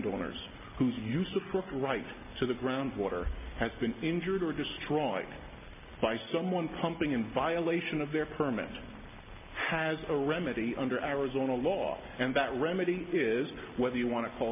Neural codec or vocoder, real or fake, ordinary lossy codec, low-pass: none; real; MP3, 32 kbps; 3.6 kHz